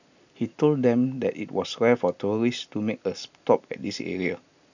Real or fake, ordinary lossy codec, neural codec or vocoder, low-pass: real; none; none; 7.2 kHz